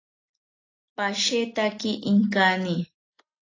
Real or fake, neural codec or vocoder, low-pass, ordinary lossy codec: real; none; 7.2 kHz; AAC, 32 kbps